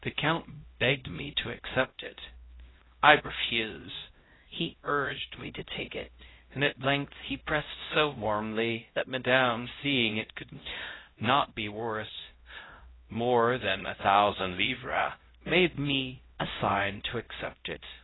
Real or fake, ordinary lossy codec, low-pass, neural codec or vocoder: fake; AAC, 16 kbps; 7.2 kHz; codec, 16 kHz, 0.5 kbps, X-Codec, HuBERT features, trained on LibriSpeech